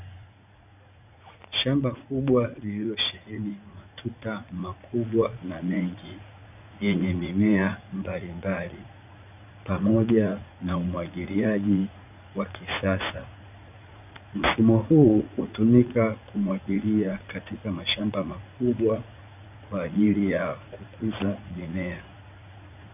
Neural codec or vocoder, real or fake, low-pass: vocoder, 44.1 kHz, 80 mel bands, Vocos; fake; 3.6 kHz